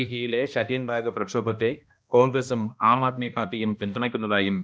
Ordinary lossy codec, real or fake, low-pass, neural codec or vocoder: none; fake; none; codec, 16 kHz, 1 kbps, X-Codec, HuBERT features, trained on balanced general audio